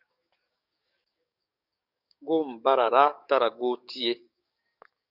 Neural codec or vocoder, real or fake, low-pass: codec, 44.1 kHz, 7.8 kbps, DAC; fake; 5.4 kHz